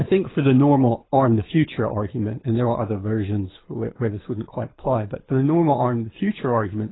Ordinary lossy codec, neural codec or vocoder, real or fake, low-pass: AAC, 16 kbps; codec, 24 kHz, 6 kbps, HILCodec; fake; 7.2 kHz